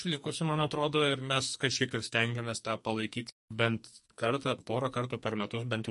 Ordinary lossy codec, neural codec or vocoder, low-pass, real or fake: MP3, 48 kbps; codec, 44.1 kHz, 2.6 kbps, SNAC; 14.4 kHz; fake